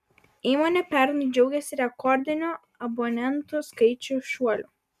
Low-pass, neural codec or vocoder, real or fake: 14.4 kHz; none; real